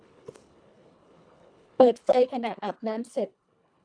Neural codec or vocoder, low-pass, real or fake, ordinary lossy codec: codec, 24 kHz, 1.5 kbps, HILCodec; 9.9 kHz; fake; none